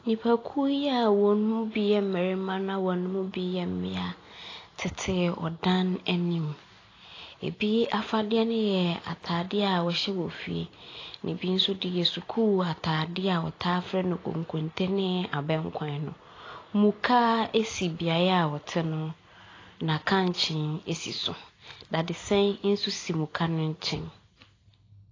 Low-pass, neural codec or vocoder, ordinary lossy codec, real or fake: 7.2 kHz; none; AAC, 32 kbps; real